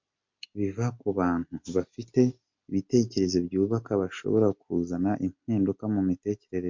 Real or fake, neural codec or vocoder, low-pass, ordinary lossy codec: real; none; 7.2 kHz; MP3, 48 kbps